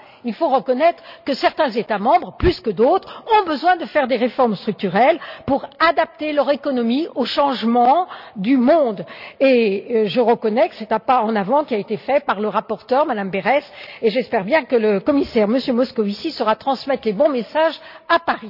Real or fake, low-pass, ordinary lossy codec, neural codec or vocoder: real; 5.4 kHz; none; none